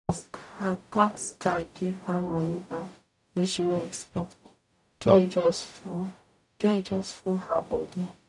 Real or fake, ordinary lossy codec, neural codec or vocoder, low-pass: fake; none; codec, 44.1 kHz, 0.9 kbps, DAC; 10.8 kHz